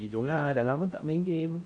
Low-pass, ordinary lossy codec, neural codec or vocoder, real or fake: 9.9 kHz; none; codec, 16 kHz in and 24 kHz out, 0.8 kbps, FocalCodec, streaming, 65536 codes; fake